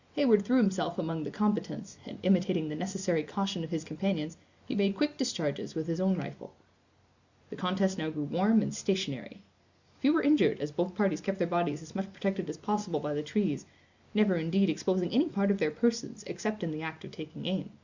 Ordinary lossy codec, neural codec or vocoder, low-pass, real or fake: Opus, 64 kbps; none; 7.2 kHz; real